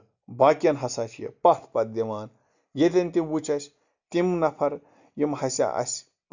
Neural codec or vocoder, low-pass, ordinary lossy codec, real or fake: none; 7.2 kHz; none; real